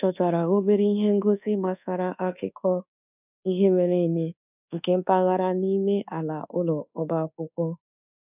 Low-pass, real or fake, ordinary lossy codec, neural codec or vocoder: 3.6 kHz; fake; none; codec, 24 kHz, 1.2 kbps, DualCodec